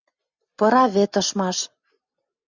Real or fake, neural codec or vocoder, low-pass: real; none; 7.2 kHz